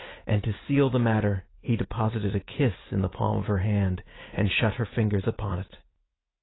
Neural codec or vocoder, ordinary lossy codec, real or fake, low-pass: none; AAC, 16 kbps; real; 7.2 kHz